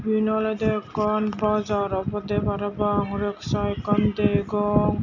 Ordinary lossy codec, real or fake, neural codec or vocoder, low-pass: none; real; none; 7.2 kHz